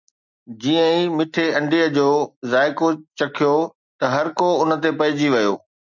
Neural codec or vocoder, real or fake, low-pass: none; real; 7.2 kHz